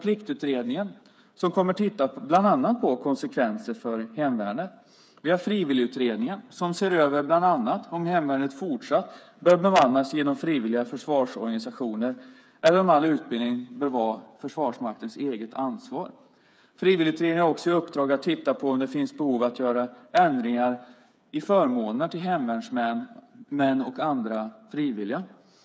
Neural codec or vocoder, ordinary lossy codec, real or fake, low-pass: codec, 16 kHz, 8 kbps, FreqCodec, smaller model; none; fake; none